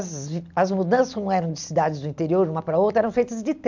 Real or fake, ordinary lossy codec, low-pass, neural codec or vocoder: real; MP3, 64 kbps; 7.2 kHz; none